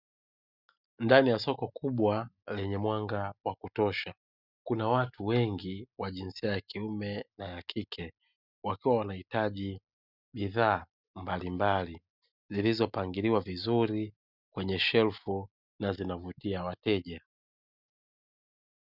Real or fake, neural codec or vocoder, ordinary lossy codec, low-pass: real; none; Opus, 64 kbps; 5.4 kHz